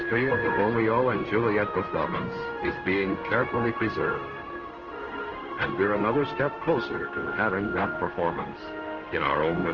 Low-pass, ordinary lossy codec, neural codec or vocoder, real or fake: 7.2 kHz; Opus, 16 kbps; codec, 16 kHz in and 24 kHz out, 1 kbps, XY-Tokenizer; fake